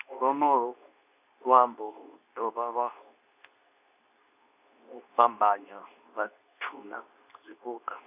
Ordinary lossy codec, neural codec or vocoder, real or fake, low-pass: none; codec, 24 kHz, 0.9 kbps, WavTokenizer, medium speech release version 2; fake; 3.6 kHz